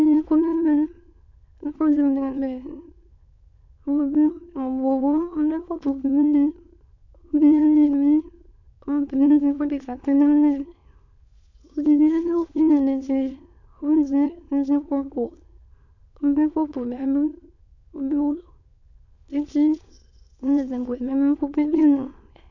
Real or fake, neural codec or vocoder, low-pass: fake; autoencoder, 22.05 kHz, a latent of 192 numbers a frame, VITS, trained on many speakers; 7.2 kHz